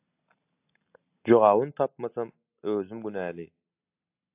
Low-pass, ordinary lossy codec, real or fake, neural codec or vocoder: 3.6 kHz; AAC, 32 kbps; real; none